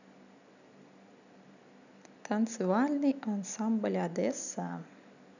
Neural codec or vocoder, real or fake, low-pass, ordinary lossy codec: none; real; 7.2 kHz; none